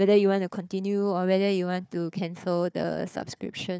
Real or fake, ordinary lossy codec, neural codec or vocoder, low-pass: fake; none; codec, 16 kHz, 4 kbps, FunCodec, trained on Chinese and English, 50 frames a second; none